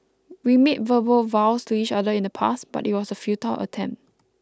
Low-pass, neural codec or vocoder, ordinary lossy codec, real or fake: none; none; none; real